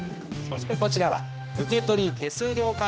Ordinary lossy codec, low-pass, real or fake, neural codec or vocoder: none; none; fake; codec, 16 kHz, 1 kbps, X-Codec, HuBERT features, trained on general audio